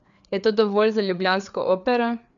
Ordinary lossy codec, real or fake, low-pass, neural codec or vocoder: none; fake; 7.2 kHz; codec, 16 kHz, 4 kbps, FreqCodec, larger model